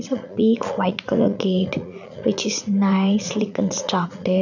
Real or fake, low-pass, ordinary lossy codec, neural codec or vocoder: fake; 7.2 kHz; none; vocoder, 44.1 kHz, 80 mel bands, Vocos